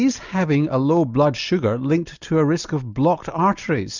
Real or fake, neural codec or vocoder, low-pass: real; none; 7.2 kHz